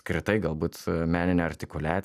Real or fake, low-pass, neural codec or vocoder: real; 14.4 kHz; none